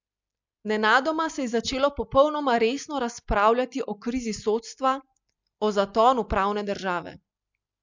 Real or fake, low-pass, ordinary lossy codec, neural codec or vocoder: real; 7.2 kHz; MP3, 64 kbps; none